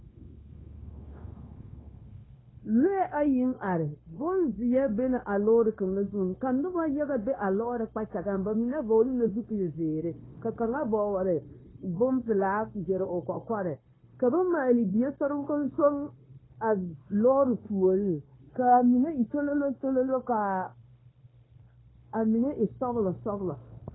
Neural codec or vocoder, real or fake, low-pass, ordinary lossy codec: codec, 16 kHz, 0.9 kbps, LongCat-Audio-Codec; fake; 7.2 kHz; AAC, 16 kbps